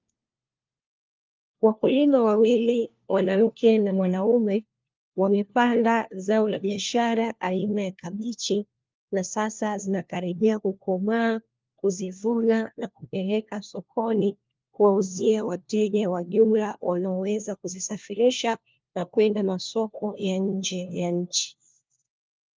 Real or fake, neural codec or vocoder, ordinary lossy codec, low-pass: fake; codec, 16 kHz, 1 kbps, FunCodec, trained on LibriTTS, 50 frames a second; Opus, 24 kbps; 7.2 kHz